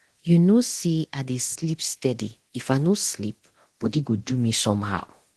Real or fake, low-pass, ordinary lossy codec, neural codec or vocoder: fake; 10.8 kHz; Opus, 16 kbps; codec, 24 kHz, 0.9 kbps, DualCodec